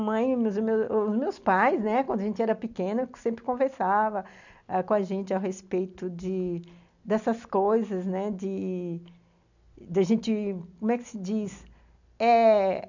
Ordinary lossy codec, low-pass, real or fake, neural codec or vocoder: none; 7.2 kHz; real; none